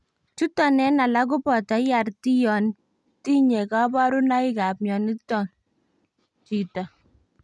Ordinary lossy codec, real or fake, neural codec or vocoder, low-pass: none; real; none; none